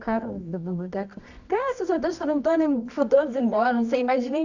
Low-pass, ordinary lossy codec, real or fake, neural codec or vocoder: 7.2 kHz; none; fake; codec, 24 kHz, 0.9 kbps, WavTokenizer, medium music audio release